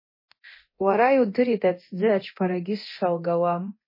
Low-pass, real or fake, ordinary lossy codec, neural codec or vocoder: 5.4 kHz; fake; MP3, 24 kbps; codec, 24 kHz, 0.9 kbps, DualCodec